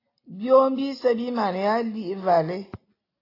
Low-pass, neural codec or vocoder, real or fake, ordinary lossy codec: 5.4 kHz; none; real; AAC, 24 kbps